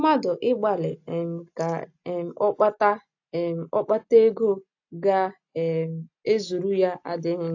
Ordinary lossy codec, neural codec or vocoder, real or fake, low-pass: AAC, 48 kbps; none; real; 7.2 kHz